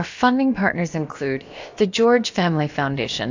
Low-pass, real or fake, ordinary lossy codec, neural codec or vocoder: 7.2 kHz; fake; AAC, 48 kbps; codec, 16 kHz, about 1 kbps, DyCAST, with the encoder's durations